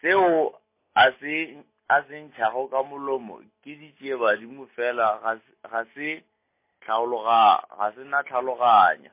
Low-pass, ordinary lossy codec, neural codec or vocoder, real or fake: 3.6 kHz; MP3, 24 kbps; none; real